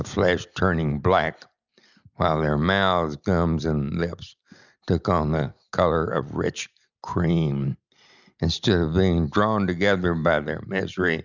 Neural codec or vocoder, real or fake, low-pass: none; real; 7.2 kHz